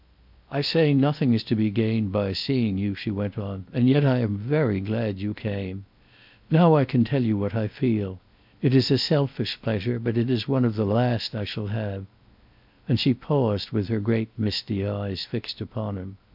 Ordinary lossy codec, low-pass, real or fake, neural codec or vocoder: MP3, 48 kbps; 5.4 kHz; fake; codec, 16 kHz in and 24 kHz out, 0.6 kbps, FocalCodec, streaming, 2048 codes